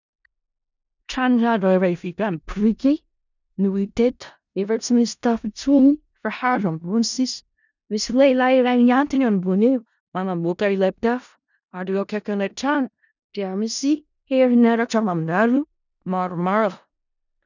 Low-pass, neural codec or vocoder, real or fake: 7.2 kHz; codec, 16 kHz in and 24 kHz out, 0.4 kbps, LongCat-Audio-Codec, four codebook decoder; fake